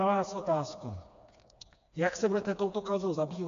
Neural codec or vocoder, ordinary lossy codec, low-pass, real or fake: codec, 16 kHz, 2 kbps, FreqCodec, smaller model; AAC, 64 kbps; 7.2 kHz; fake